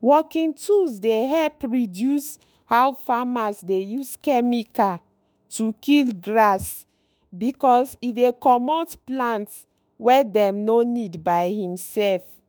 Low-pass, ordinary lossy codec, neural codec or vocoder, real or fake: none; none; autoencoder, 48 kHz, 32 numbers a frame, DAC-VAE, trained on Japanese speech; fake